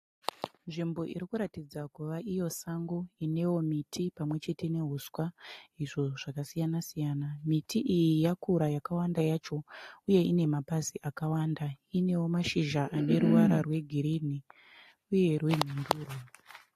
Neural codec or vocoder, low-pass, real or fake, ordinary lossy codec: none; 14.4 kHz; real; AAC, 48 kbps